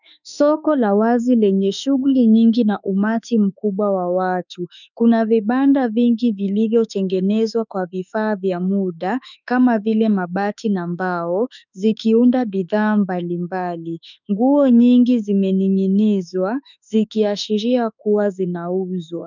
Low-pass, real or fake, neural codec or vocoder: 7.2 kHz; fake; autoencoder, 48 kHz, 32 numbers a frame, DAC-VAE, trained on Japanese speech